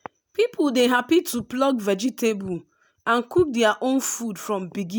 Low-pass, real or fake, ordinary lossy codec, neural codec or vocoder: none; real; none; none